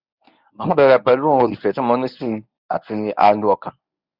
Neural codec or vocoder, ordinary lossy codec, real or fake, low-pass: codec, 24 kHz, 0.9 kbps, WavTokenizer, medium speech release version 1; Opus, 64 kbps; fake; 5.4 kHz